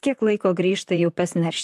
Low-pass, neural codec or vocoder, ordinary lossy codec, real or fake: 14.4 kHz; vocoder, 44.1 kHz, 128 mel bands, Pupu-Vocoder; AAC, 96 kbps; fake